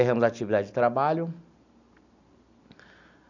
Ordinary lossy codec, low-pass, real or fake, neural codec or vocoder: none; 7.2 kHz; real; none